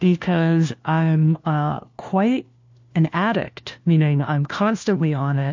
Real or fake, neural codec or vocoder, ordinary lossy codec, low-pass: fake; codec, 16 kHz, 1 kbps, FunCodec, trained on LibriTTS, 50 frames a second; MP3, 48 kbps; 7.2 kHz